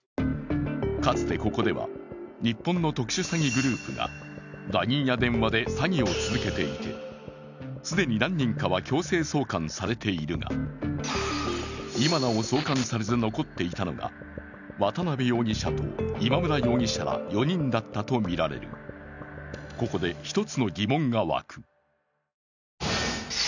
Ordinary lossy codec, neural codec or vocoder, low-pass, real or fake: none; none; 7.2 kHz; real